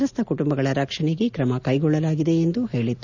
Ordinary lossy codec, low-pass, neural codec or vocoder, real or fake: none; 7.2 kHz; none; real